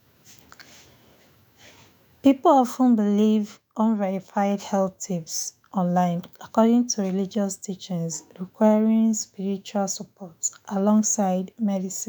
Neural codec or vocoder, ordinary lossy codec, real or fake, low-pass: autoencoder, 48 kHz, 128 numbers a frame, DAC-VAE, trained on Japanese speech; none; fake; none